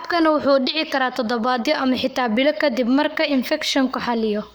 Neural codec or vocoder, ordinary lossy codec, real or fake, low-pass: codec, 44.1 kHz, 7.8 kbps, Pupu-Codec; none; fake; none